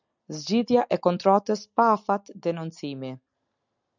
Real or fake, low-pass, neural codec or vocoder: real; 7.2 kHz; none